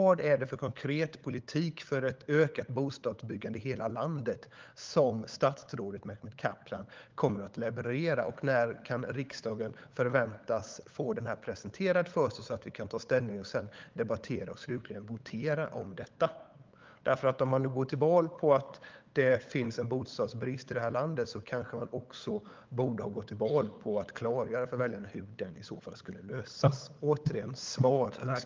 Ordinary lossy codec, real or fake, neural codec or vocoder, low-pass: Opus, 32 kbps; fake; codec, 16 kHz, 8 kbps, FunCodec, trained on LibriTTS, 25 frames a second; 7.2 kHz